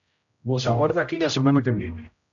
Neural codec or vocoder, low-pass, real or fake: codec, 16 kHz, 0.5 kbps, X-Codec, HuBERT features, trained on general audio; 7.2 kHz; fake